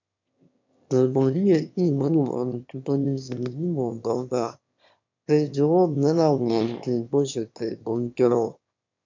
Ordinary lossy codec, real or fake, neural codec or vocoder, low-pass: MP3, 64 kbps; fake; autoencoder, 22.05 kHz, a latent of 192 numbers a frame, VITS, trained on one speaker; 7.2 kHz